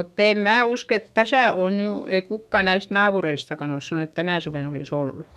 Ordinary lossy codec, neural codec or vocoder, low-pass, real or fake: none; codec, 32 kHz, 1.9 kbps, SNAC; 14.4 kHz; fake